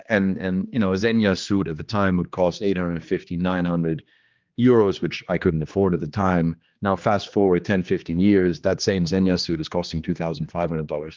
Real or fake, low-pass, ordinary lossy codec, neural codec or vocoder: fake; 7.2 kHz; Opus, 24 kbps; codec, 16 kHz, 2 kbps, X-Codec, HuBERT features, trained on general audio